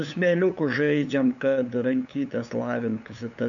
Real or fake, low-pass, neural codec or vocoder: fake; 7.2 kHz; codec, 16 kHz, 8 kbps, FunCodec, trained on LibriTTS, 25 frames a second